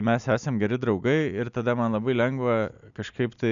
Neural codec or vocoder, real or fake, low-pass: none; real; 7.2 kHz